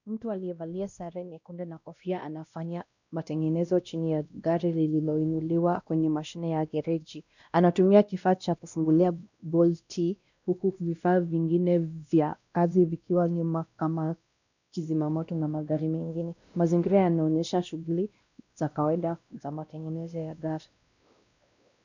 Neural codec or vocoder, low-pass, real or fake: codec, 16 kHz, 1 kbps, X-Codec, WavLM features, trained on Multilingual LibriSpeech; 7.2 kHz; fake